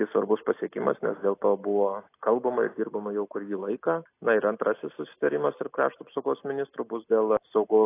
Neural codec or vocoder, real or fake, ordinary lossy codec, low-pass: none; real; AAC, 24 kbps; 3.6 kHz